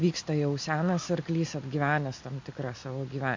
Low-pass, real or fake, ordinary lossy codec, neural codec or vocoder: 7.2 kHz; real; MP3, 64 kbps; none